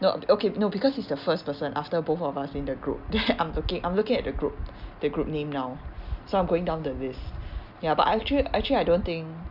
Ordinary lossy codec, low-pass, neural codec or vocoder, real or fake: none; 5.4 kHz; none; real